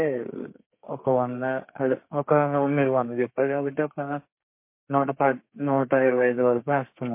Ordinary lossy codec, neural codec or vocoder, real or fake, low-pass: MP3, 24 kbps; codec, 32 kHz, 1.9 kbps, SNAC; fake; 3.6 kHz